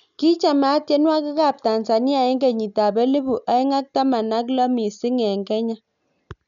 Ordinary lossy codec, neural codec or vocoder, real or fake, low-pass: none; none; real; 7.2 kHz